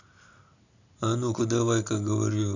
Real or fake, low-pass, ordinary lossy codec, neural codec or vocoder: real; 7.2 kHz; none; none